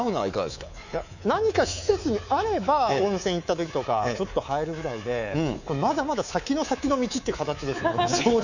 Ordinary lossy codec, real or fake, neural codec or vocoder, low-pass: none; fake; codec, 24 kHz, 3.1 kbps, DualCodec; 7.2 kHz